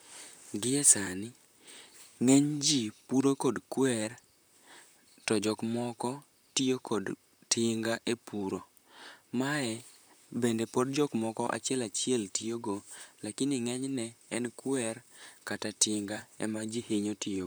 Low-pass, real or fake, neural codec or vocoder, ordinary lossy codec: none; fake; vocoder, 44.1 kHz, 128 mel bands, Pupu-Vocoder; none